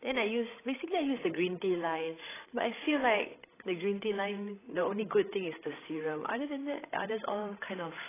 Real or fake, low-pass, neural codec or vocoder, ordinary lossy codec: fake; 3.6 kHz; codec, 16 kHz, 16 kbps, FreqCodec, larger model; AAC, 16 kbps